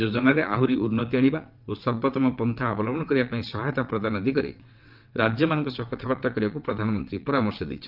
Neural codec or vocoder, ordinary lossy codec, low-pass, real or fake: vocoder, 22.05 kHz, 80 mel bands, WaveNeXt; Opus, 24 kbps; 5.4 kHz; fake